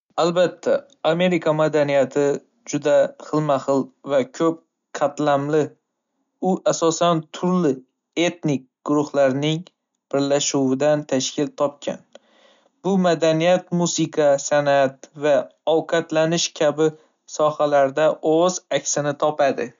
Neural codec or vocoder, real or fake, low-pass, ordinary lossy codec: none; real; 7.2 kHz; MP3, 64 kbps